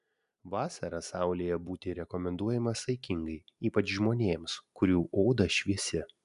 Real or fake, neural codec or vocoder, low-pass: real; none; 10.8 kHz